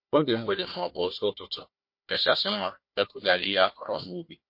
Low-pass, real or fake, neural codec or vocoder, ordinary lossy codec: 5.4 kHz; fake; codec, 16 kHz, 1 kbps, FunCodec, trained on Chinese and English, 50 frames a second; MP3, 32 kbps